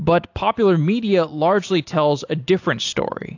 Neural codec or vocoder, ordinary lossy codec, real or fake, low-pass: none; AAC, 48 kbps; real; 7.2 kHz